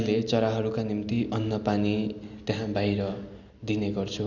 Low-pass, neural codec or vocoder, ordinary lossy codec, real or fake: 7.2 kHz; none; none; real